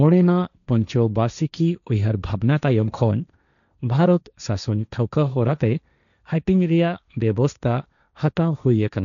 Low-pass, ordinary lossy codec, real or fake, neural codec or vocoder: 7.2 kHz; none; fake; codec, 16 kHz, 1.1 kbps, Voila-Tokenizer